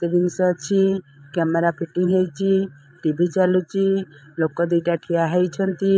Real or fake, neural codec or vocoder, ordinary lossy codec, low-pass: fake; codec, 16 kHz, 16 kbps, FreqCodec, larger model; none; none